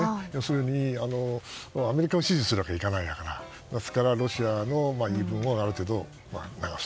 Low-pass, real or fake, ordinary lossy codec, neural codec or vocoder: none; real; none; none